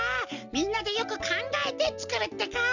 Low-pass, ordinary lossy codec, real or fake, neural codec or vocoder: 7.2 kHz; none; real; none